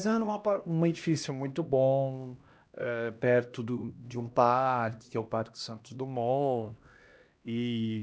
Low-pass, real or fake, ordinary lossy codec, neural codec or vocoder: none; fake; none; codec, 16 kHz, 1 kbps, X-Codec, HuBERT features, trained on LibriSpeech